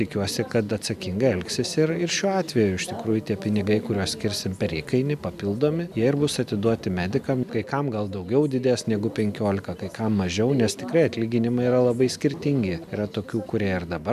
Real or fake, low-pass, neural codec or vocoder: real; 14.4 kHz; none